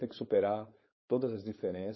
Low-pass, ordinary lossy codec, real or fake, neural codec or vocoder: 7.2 kHz; MP3, 24 kbps; fake; codec, 16 kHz, 4.8 kbps, FACodec